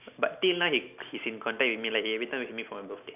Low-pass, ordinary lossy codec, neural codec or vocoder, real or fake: 3.6 kHz; none; none; real